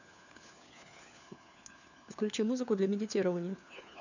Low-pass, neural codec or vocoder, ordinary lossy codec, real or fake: 7.2 kHz; codec, 16 kHz, 4 kbps, FunCodec, trained on LibriTTS, 50 frames a second; none; fake